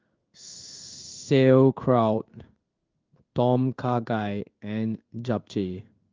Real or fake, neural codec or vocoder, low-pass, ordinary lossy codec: fake; codec, 16 kHz in and 24 kHz out, 1 kbps, XY-Tokenizer; 7.2 kHz; Opus, 32 kbps